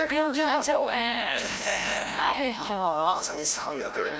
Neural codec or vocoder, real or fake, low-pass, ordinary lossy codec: codec, 16 kHz, 0.5 kbps, FreqCodec, larger model; fake; none; none